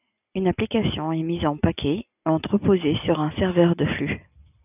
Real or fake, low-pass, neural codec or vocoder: real; 3.6 kHz; none